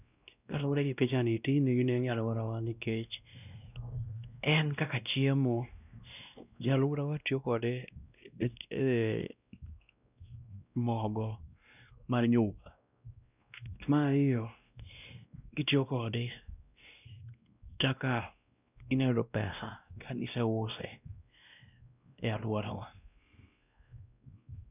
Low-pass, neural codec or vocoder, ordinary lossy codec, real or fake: 3.6 kHz; codec, 16 kHz, 1 kbps, X-Codec, WavLM features, trained on Multilingual LibriSpeech; none; fake